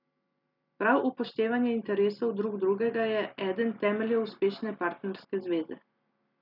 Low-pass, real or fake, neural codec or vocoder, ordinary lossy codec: 5.4 kHz; real; none; none